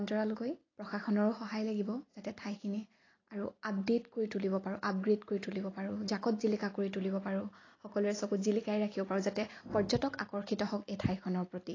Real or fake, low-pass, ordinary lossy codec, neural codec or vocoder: real; 7.2 kHz; AAC, 32 kbps; none